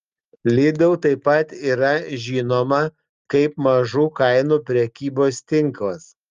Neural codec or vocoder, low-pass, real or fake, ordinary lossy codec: none; 7.2 kHz; real; Opus, 32 kbps